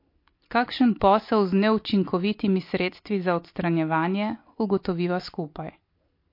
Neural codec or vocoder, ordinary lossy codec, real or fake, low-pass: none; MP3, 32 kbps; real; 5.4 kHz